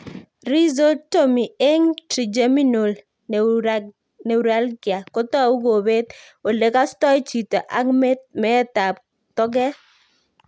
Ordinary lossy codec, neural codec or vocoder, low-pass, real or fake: none; none; none; real